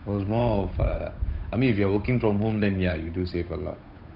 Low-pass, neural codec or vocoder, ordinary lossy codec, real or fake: 5.4 kHz; codec, 16 kHz, 8 kbps, FunCodec, trained on Chinese and English, 25 frames a second; none; fake